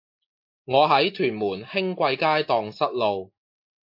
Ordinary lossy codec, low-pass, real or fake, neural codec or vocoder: MP3, 32 kbps; 5.4 kHz; real; none